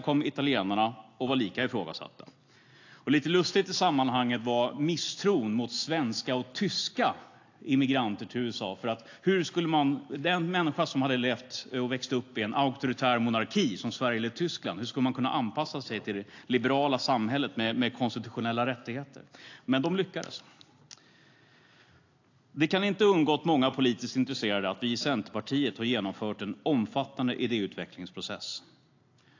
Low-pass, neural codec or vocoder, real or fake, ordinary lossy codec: 7.2 kHz; none; real; AAC, 48 kbps